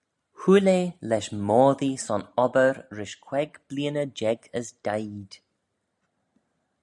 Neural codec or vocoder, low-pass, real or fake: none; 10.8 kHz; real